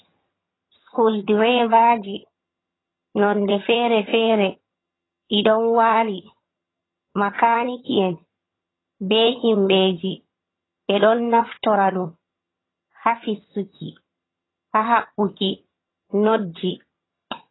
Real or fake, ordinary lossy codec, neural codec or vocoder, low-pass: fake; AAC, 16 kbps; vocoder, 22.05 kHz, 80 mel bands, HiFi-GAN; 7.2 kHz